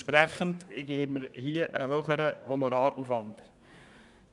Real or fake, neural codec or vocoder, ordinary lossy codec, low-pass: fake; codec, 24 kHz, 1 kbps, SNAC; none; 10.8 kHz